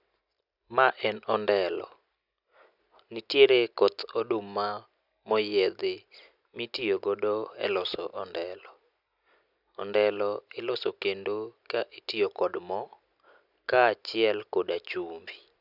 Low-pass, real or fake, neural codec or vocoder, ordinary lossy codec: 5.4 kHz; real; none; none